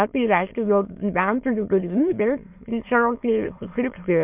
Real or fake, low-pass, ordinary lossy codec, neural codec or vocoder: fake; 3.6 kHz; MP3, 32 kbps; autoencoder, 22.05 kHz, a latent of 192 numbers a frame, VITS, trained on many speakers